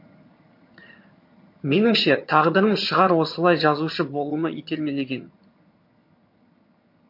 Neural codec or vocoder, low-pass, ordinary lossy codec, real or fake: vocoder, 22.05 kHz, 80 mel bands, HiFi-GAN; 5.4 kHz; MP3, 32 kbps; fake